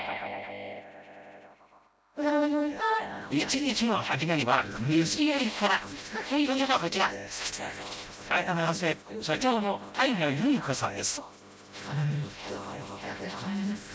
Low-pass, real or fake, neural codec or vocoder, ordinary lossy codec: none; fake; codec, 16 kHz, 0.5 kbps, FreqCodec, smaller model; none